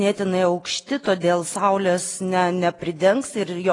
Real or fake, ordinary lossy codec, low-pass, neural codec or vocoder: real; AAC, 32 kbps; 10.8 kHz; none